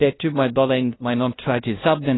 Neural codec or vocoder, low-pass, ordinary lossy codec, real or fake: codec, 16 kHz, 0.5 kbps, FunCodec, trained on LibriTTS, 25 frames a second; 7.2 kHz; AAC, 16 kbps; fake